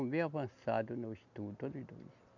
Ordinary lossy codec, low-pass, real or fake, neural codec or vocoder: none; 7.2 kHz; real; none